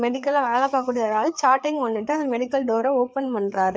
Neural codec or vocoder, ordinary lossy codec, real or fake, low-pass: codec, 16 kHz, 4 kbps, FreqCodec, larger model; none; fake; none